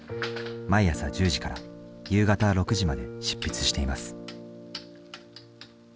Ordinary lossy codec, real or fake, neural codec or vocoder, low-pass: none; real; none; none